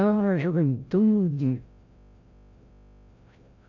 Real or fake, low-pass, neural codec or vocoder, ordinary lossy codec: fake; 7.2 kHz; codec, 16 kHz, 0.5 kbps, FreqCodec, larger model; AAC, 48 kbps